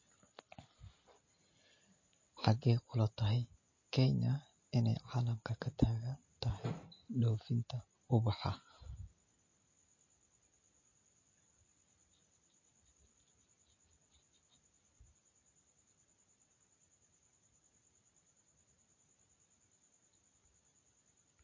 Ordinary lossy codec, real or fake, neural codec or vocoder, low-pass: MP3, 32 kbps; real; none; 7.2 kHz